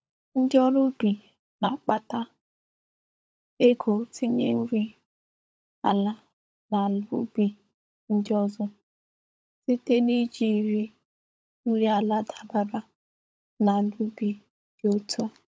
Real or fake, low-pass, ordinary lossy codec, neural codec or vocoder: fake; none; none; codec, 16 kHz, 16 kbps, FunCodec, trained on LibriTTS, 50 frames a second